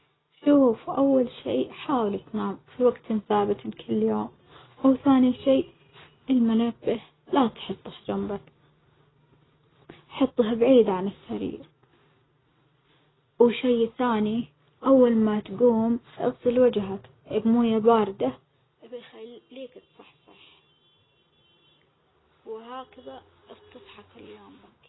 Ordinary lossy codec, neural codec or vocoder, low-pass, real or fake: AAC, 16 kbps; none; 7.2 kHz; real